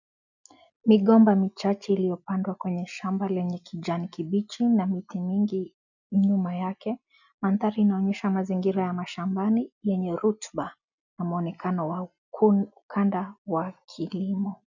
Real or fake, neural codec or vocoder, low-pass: real; none; 7.2 kHz